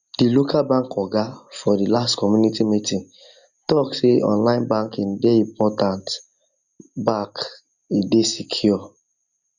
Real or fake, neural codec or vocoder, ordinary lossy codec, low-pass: real; none; none; 7.2 kHz